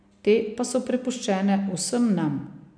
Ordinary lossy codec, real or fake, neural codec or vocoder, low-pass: MP3, 64 kbps; real; none; 9.9 kHz